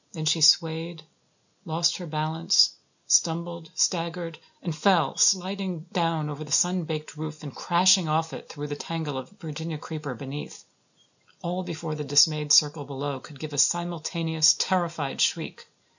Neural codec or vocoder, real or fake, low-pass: none; real; 7.2 kHz